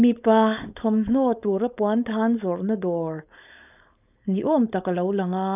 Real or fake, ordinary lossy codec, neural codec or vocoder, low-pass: fake; none; codec, 16 kHz, 4.8 kbps, FACodec; 3.6 kHz